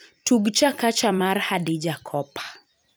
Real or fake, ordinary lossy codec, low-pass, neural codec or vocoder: fake; none; none; vocoder, 44.1 kHz, 128 mel bands every 256 samples, BigVGAN v2